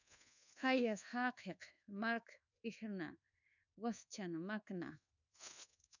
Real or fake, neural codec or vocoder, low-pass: fake; codec, 24 kHz, 1.2 kbps, DualCodec; 7.2 kHz